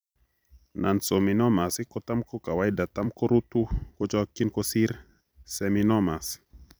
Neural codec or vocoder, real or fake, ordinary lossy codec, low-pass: vocoder, 44.1 kHz, 128 mel bands every 256 samples, BigVGAN v2; fake; none; none